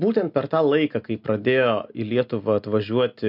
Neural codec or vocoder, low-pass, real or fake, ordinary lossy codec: none; 5.4 kHz; real; MP3, 48 kbps